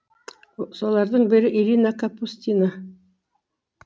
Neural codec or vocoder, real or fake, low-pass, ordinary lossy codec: none; real; none; none